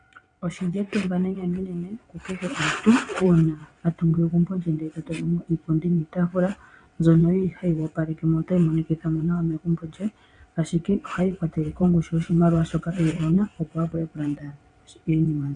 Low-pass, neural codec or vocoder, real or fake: 9.9 kHz; vocoder, 22.05 kHz, 80 mel bands, WaveNeXt; fake